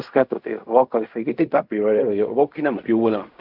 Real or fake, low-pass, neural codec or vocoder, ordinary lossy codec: fake; 5.4 kHz; codec, 16 kHz in and 24 kHz out, 0.4 kbps, LongCat-Audio-Codec, fine tuned four codebook decoder; AAC, 48 kbps